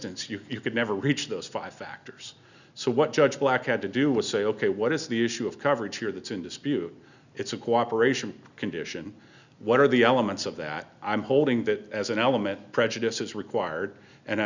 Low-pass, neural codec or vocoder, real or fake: 7.2 kHz; none; real